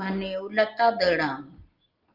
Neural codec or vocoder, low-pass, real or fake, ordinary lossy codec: none; 5.4 kHz; real; Opus, 24 kbps